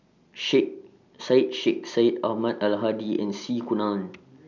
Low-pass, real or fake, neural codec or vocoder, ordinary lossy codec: 7.2 kHz; real; none; none